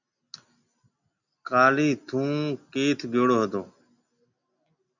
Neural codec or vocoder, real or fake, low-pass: none; real; 7.2 kHz